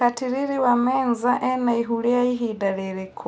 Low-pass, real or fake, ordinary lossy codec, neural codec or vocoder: none; real; none; none